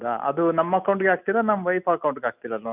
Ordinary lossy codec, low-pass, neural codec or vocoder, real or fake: none; 3.6 kHz; none; real